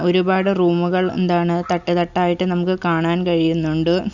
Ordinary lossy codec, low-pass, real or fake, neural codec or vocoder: none; 7.2 kHz; real; none